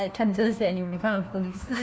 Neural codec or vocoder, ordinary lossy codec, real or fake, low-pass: codec, 16 kHz, 1 kbps, FunCodec, trained on LibriTTS, 50 frames a second; none; fake; none